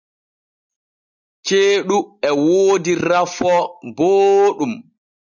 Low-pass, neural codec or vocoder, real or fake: 7.2 kHz; none; real